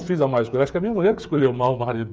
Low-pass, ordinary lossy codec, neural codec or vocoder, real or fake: none; none; codec, 16 kHz, 8 kbps, FreqCodec, smaller model; fake